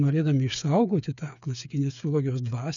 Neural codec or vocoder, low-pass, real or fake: codec, 16 kHz, 8 kbps, FreqCodec, smaller model; 7.2 kHz; fake